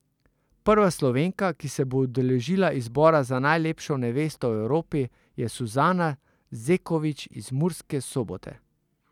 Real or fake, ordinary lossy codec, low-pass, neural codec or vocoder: real; none; 19.8 kHz; none